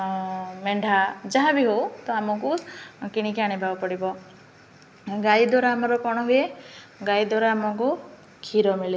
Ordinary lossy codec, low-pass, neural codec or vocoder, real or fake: none; none; none; real